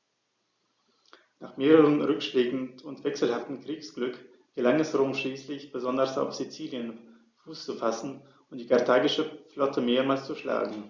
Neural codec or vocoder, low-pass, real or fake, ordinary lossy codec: none; 7.2 kHz; real; Opus, 64 kbps